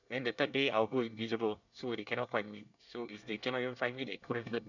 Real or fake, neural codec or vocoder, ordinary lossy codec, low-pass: fake; codec, 24 kHz, 1 kbps, SNAC; none; 7.2 kHz